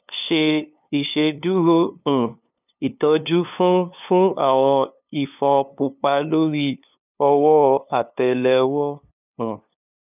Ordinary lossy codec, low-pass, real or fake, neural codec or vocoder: none; 3.6 kHz; fake; codec, 16 kHz, 2 kbps, FunCodec, trained on LibriTTS, 25 frames a second